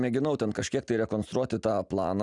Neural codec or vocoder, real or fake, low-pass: none; real; 10.8 kHz